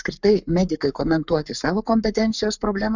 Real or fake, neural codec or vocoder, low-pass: fake; codec, 44.1 kHz, 7.8 kbps, Pupu-Codec; 7.2 kHz